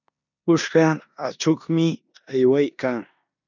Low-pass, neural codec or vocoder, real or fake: 7.2 kHz; codec, 16 kHz in and 24 kHz out, 0.9 kbps, LongCat-Audio-Codec, four codebook decoder; fake